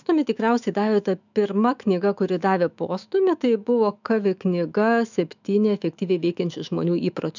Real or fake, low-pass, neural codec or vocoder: fake; 7.2 kHz; autoencoder, 48 kHz, 128 numbers a frame, DAC-VAE, trained on Japanese speech